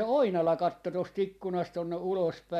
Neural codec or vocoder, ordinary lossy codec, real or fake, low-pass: none; MP3, 96 kbps; real; 14.4 kHz